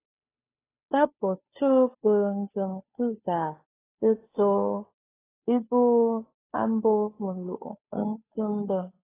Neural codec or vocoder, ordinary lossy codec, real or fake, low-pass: codec, 16 kHz, 2 kbps, FunCodec, trained on Chinese and English, 25 frames a second; AAC, 16 kbps; fake; 3.6 kHz